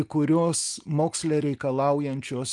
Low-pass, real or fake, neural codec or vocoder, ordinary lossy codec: 10.8 kHz; real; none; Opus, 32 kbps